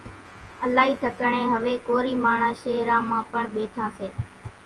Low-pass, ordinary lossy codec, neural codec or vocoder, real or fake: 10.8 kHz; Opus, 24 kbps; vocoder, 48 kHz, 128 mel bands, Vocos; fake